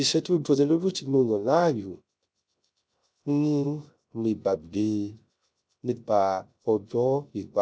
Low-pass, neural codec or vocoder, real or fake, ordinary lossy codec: none; codec, 16 kHz, 0.3 kbps, FocalCodec; fake; none